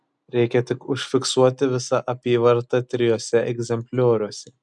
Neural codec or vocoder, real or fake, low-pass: none; real; 10.8 kHz